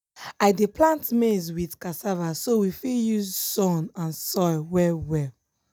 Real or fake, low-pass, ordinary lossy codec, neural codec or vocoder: real; none; none; none